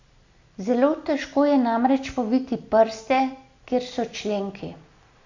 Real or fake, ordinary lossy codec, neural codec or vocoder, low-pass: real; AAC, 48 kbps; none; 7.2 kHz